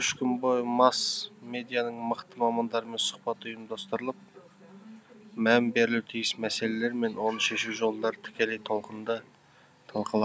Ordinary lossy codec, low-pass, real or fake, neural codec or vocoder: none; none; real; none